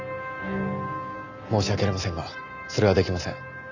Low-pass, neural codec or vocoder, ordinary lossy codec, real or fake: 7.2 kHz; none; none; real